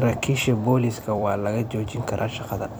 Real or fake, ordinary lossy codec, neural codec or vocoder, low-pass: fake; none; vocoder, 44.1 kHz, 128 mel bands every 256 samples, BigVGAN v2; none